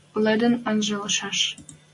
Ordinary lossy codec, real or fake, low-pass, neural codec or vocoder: MP3, 48 kbps; real; 10.8 kHz; none